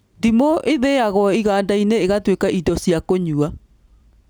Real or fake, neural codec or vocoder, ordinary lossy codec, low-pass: real; none; none; none